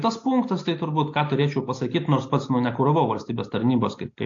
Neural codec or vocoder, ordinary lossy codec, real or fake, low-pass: none; AAC, 48 kbps; real; 7.2 kHz